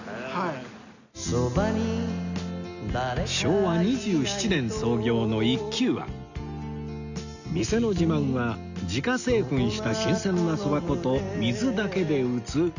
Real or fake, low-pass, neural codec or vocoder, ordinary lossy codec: real; 7.2 kHz; none; none